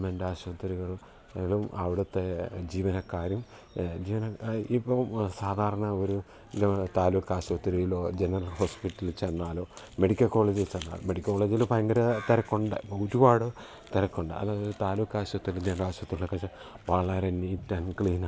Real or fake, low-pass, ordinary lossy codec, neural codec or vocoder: real; none; none; none